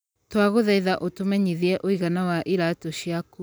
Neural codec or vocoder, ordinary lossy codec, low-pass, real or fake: none; none; none; real